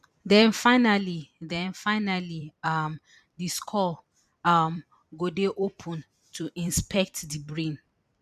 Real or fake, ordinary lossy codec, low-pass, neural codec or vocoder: fake; none; 14.4 kHz; vocoder, 44.1 kHz, 128 mel bands every 256 samples, BigVGAN v2